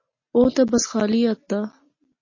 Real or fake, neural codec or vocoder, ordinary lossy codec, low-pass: real; none; MP3, 32 kbps; 7.2 kHz